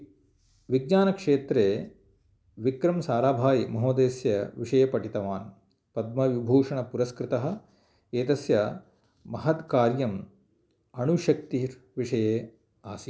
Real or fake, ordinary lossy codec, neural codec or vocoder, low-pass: real; none; none; none